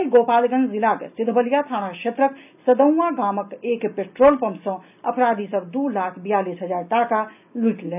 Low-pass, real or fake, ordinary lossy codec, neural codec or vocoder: 3.6 kHz; real; none; none